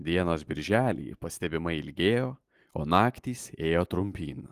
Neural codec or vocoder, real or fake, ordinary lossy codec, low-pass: none; real; Opus, 24 kbps; 14.4 kHz